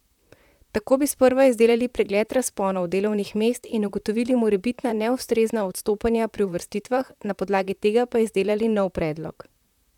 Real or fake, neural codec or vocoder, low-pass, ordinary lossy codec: fake; vocoder, 44.1 kHz, 128 mel bands, Pupu-Vocoder; 19.8 kHz; none